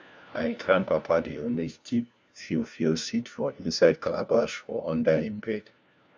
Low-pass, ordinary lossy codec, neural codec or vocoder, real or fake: none; none; codec, 16 kHz, 1 kbps, FunCodec, trained on LibriTTS, 50 frames a second; fake